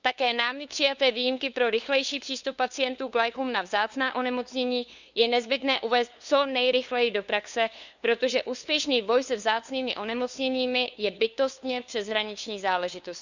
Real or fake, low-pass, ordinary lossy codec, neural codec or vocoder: fake; 7.2 kHz; none; codec, 16 kHz, 2 kbps, FunCodec, trained on LibriTTS, 25 frames a second